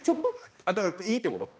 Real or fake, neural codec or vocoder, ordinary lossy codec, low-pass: fake; codec, 16 kHz, 1 kbps, X-Codec, HuBERT features, trained on balanced general audio; none; none